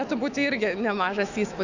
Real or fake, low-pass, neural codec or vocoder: fake; 7.2 kHz; autoencoder, 48 kHz, 128 numbers a frame, DAC-VAE, trained on Japanese speech